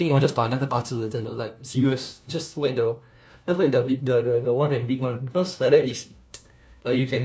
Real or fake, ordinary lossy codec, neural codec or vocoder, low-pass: fake; none; codec, 16 kHz, 1 kbps, FunCodec, trained on LibriTTS, 50 frames a second; none